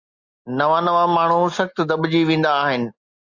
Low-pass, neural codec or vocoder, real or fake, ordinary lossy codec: 7.2 kHz; none; real; Opus, 64 kbps